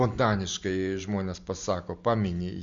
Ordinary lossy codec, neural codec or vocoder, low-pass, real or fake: MP3, 48 kbps; none; 7.2 kHz; real